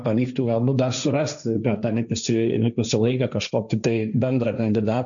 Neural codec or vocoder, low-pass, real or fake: codec, 16 kHz, 1.1 kbps, Voila-Tokenizer; 7.2 kHz; fake